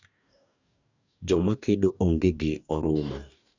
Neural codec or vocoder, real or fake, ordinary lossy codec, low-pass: codec, 44.1 kHz, 2.6 kbps, DAC; fake; none; 7.2 kHz